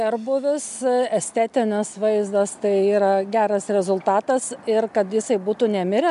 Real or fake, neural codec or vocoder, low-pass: real; none; 10.8 kHz